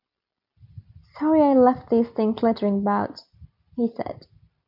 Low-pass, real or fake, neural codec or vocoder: 5.4 kHz; real; none